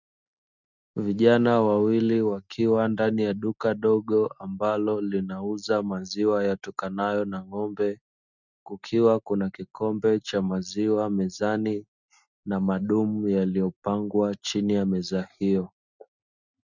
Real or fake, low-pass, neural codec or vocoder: real; 7.2 kHz; none